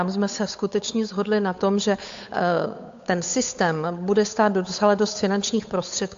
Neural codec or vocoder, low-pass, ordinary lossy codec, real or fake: codec, 16 kHz, 8 kbps, FunCodec, trained on Chinese and English, 25 frames a second; 7.2 kHz; AAC, 64 kbps; fake